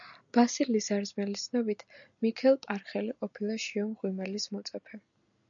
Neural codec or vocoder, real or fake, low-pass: none; real; 7.2 kHz